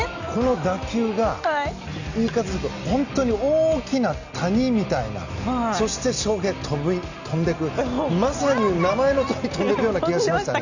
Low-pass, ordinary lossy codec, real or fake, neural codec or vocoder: 7.2 kHz; Opus, 64 kbps; real; none